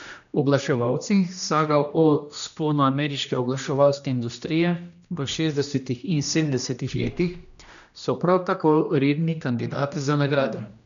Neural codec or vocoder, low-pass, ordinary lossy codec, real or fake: codec, 16 kHz, 1 kbps, X-Codec, HuBERT features, trained on general audio; 7.2 kHz; none; fake